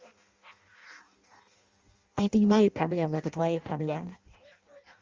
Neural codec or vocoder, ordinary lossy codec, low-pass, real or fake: codec, 16 kHz in and 24 kHz out, 0.6 kbps, FireRedTTS-2 codec; Opus, 32 kbps; 7.2 kHz; fake